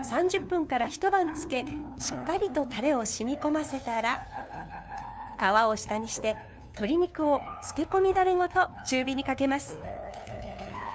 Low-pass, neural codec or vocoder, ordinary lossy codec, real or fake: none; codec, 16 kHz, 2 kbps, FunCodec, trained on LibriTTS, 25 frames a second; none; fake